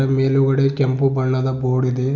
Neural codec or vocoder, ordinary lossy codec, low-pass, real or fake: none; none; 7.2 kHz; real